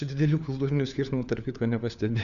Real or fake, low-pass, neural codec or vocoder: fake; 7.2 kHz; codec, 16 kHz, 4 kbps, FunCodec, trained on LibriTTS, 50 frames a second